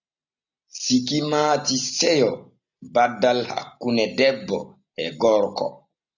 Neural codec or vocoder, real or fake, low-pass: none; real; 7.2 kHz